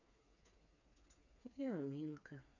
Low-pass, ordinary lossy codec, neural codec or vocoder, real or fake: 7.2 kHz; none; codec, 16 kHz, 4 kbps, FreqCodec, smaller model; fake